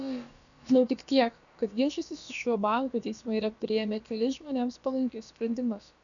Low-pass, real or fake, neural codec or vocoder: 7.2 kHz; fake; codec, 16 kHz, about 1 kbps, DyCAST, with the encoder's durations